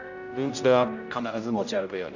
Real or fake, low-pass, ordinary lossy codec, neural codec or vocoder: fake; 7.2 kHz; none; codec, 16 kHz, 0.5 kbps, X-Codec, HuBERT features, trained on general audio